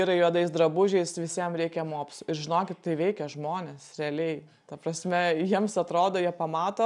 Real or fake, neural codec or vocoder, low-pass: real; none; 10.8 kHz